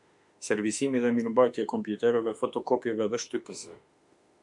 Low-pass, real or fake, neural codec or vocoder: 10.8 kHz; fake; autoencoder, 48 kHz, 32 numbers a frame, DAC-VAE, trained on Japanese speech